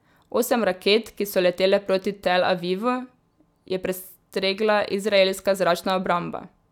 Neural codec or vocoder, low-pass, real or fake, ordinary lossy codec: none; 19.8 kHz; real; none